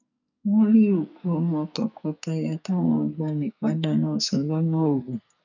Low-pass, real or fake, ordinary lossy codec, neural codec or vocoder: 7.2 kHz; fake; none; codec, 44.1 kHz, 2.6 kbps, SNAC